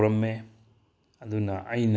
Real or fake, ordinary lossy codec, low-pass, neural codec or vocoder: real; none; none; none